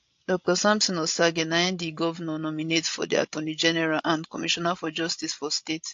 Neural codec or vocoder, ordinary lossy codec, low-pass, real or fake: codec, 16 kHz, 16 kbps, FreqCodec, larger model; AAC, 48 kbps; 7.2 kHz; fake